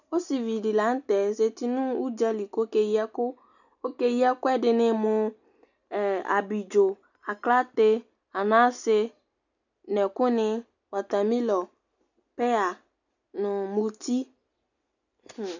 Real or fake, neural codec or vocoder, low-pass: real; none; 7.2 kHz